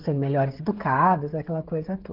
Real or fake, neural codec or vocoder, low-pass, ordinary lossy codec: fake; codec, 16 kHz, 16 kbps, FreqCodec, smaller model; 5.4 kHz; Opus, 16 kbps